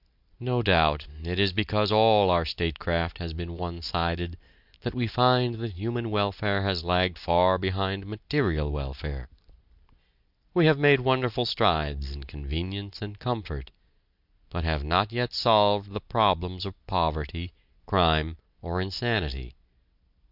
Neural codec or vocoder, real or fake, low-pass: none; real; 5.4 kHz